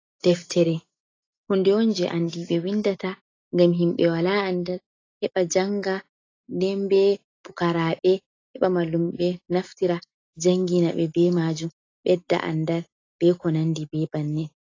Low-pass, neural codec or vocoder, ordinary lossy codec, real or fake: 7.2 kHz; none; AAC, 32 kbps; real